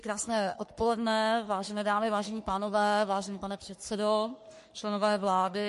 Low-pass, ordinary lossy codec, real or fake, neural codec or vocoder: 14.4 kHz; MP3, 48 kbps; fake; codec, 44.1 kHz, 3.4 kbps, Pupu-Codec